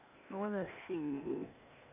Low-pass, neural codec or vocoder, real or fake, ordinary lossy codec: 3.6 kHz; codec, 16 kHz, 0.8 kbps, ZipCodec; fake; none